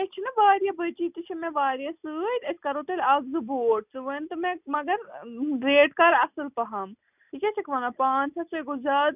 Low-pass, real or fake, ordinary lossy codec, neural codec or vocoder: 3.6 kHz; real; none; none